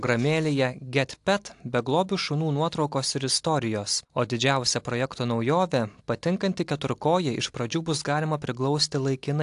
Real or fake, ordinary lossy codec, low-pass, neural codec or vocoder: real; AAC, 64 kbps; 10.8 kHz; none